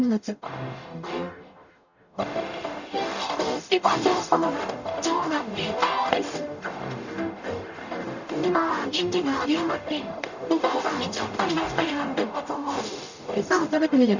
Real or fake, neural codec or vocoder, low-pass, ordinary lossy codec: fake; codec, 44.1 kHz, 0.9 kbps, DAC; 7.2 kHz; none